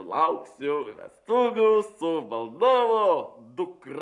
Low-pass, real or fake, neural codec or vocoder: 10.8 kHz; fake; codec, 44.1 kHz, 7.8 kbps, Pupu-Codec